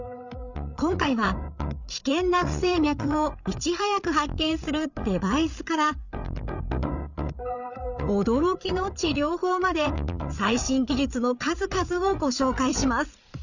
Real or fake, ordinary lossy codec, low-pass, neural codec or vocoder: fake; none; 7.2 kHz; codec, 16 kHz, 8 kbps, FreqCodec, larger model